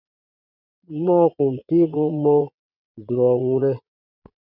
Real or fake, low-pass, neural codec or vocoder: fake; 5.4 kHz; vocoder, 22.05 kHz, 80 mel bands, Vocos